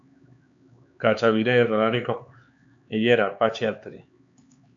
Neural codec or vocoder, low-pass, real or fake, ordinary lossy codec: codec, 16 kHz, 4 kbps, X-Codec, HuBERT features, trained on LibriSpeech; 7.2 kHz; fake; AAC, 64 kbps